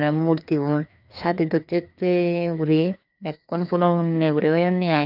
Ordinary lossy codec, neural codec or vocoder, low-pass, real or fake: none; codec, 16 kHz, 2 kbps, FreqCodec, larger model; 5.4 kHz; fake